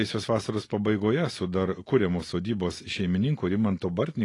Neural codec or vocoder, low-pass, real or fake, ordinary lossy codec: none; 10.8 kHz; real; AAC, 32 kbps